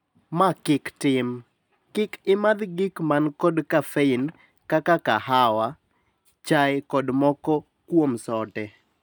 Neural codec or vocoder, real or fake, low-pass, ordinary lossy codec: none; real; none; none